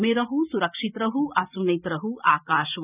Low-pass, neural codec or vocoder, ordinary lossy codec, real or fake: 3.6 kHz; none; none; real